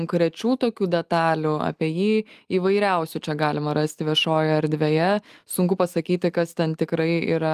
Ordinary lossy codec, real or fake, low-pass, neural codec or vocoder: Opus, 32 kbps; real; 14.4 kHz; none